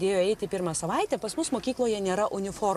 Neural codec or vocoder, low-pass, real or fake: none; 14.4 kHz; real